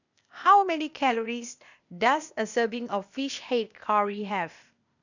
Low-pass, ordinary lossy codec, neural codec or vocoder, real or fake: 7.2 kHz; none; codec, 16 kHz, 0.8 kbps, ZipCodec; fake